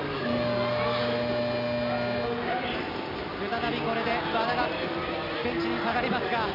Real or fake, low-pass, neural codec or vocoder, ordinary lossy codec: real; 5.4 kHz; none; none